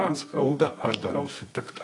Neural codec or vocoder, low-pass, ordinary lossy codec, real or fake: codec, 24 kHz, 0.9 kbps, WavTokenizer, medium music audio release; 10.8 kHz; AAC, 48 kbps; fake